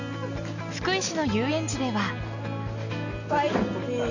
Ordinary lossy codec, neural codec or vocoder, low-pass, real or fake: none; none; 7.2 kHz; real